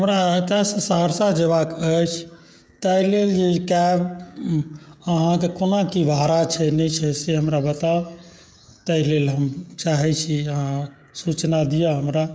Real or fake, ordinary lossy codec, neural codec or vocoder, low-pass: fake; none; codec, 16 kHz, 16 kbps, FreqCodec, smaller model; none